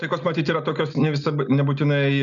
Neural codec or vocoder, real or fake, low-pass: none; real; 7.2 kHz